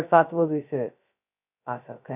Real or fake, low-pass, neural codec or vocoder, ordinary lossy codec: fake; 3.6 kHz; codec, 16 kHz, 0.2 kbps, FocalCodec; none